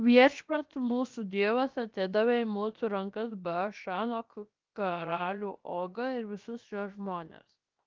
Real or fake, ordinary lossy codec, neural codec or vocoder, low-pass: fake; Opus, 32 kbps; codec, 16 kHz, 0.7 kbps, FocalCodec; 7.2 kHz